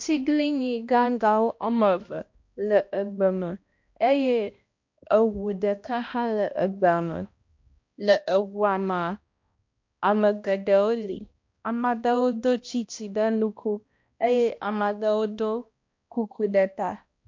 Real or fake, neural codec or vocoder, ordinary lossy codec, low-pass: fake; codec, 16 kHz, 1 kbps, X-Codec, HuBERT features, trained on balanced general audio; MP3, 48 kbps; 7.2 kHz